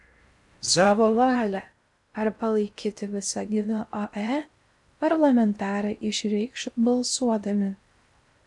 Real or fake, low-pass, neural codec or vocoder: fake; 10.8 kHz; codec, 16 kHz in and 24 kHz out, 0.6 kbps, FocalCodec, streaming, 4096 codes